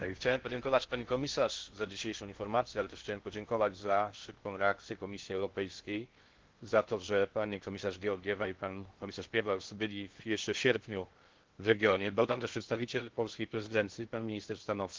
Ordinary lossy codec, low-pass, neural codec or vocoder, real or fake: Opus, 16 kbps; 7.2 kHz; codec, 16 kHz in and 24 kHz out, 0.6 kbps, FocalCodec, streaming, 4096 codes; fake